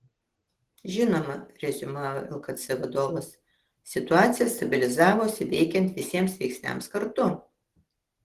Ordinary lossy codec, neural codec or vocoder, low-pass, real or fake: Opus, 16 kbps; none; 14.4 kHz; real